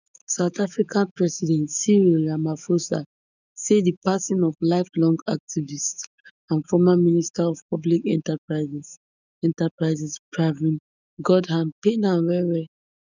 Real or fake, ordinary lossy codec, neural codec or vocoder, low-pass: fake; none; autoencoder, 48 kHz, 128 numbers a frame, DAC-VAE, trained on Japanese speech; 7.2 kHz